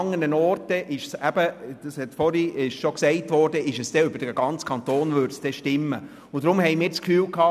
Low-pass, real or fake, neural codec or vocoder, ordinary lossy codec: 14.4 kHz; real; none; none